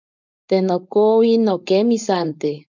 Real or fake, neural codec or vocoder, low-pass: fake; codec, 16 kHz, 4.8 kbps, FACodec; 7.2 kHz